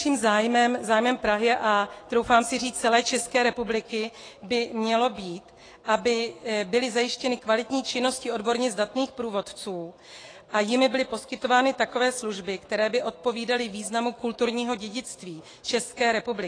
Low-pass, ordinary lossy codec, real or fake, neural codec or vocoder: 9.9 kHz; AAC, 32 kbps; fake; autoencoder, 48 kHz, 128 numbers a frame, DAC-VAE, trained on Japanese speech